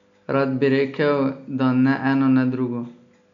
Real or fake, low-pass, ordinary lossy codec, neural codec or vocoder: real; 7.2 kHz; none; none